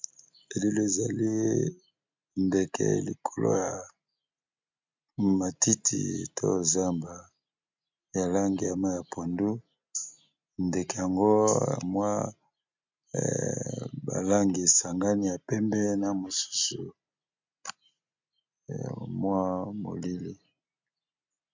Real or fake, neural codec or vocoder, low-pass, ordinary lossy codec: real; none; 7.2 kHz; MP3, 64 kbps